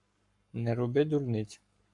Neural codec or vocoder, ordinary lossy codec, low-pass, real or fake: codec, 44.1 kHz, 7.8 kbps, Pupu-Codec; MP3, 96 kbps; 10.8 kHz; fake